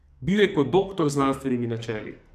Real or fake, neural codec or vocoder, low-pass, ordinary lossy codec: fake; codec, 44.1 kHz, 2.6 kbps, SNAC; 14.4 kHz; none